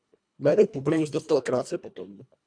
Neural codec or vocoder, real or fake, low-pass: codec, 24 kHz, 1.5 kbps, HILCodec; fake; 9.9 kHz